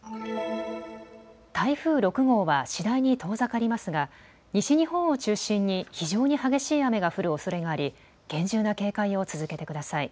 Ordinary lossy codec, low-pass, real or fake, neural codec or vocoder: none; none; real; none